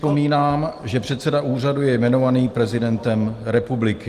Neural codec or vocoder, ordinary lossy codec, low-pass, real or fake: none; Opus, 24 kbps; 14.4 kHz; real